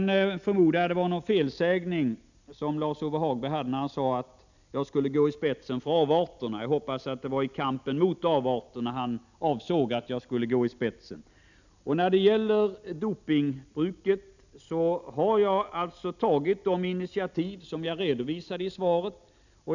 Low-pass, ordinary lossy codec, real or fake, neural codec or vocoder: 7.2 kHz; none; real; none